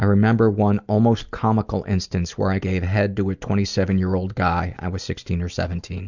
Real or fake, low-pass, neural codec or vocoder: real; 7.2 kHz; none